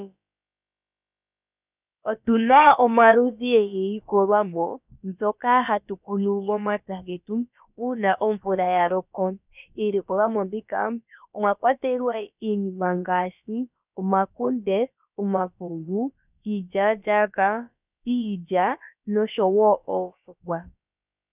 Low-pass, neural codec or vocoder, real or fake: 3.6 kHz; codec, 16 kHz, about 1 kbps, DyCAST, with the encoder's durations; fake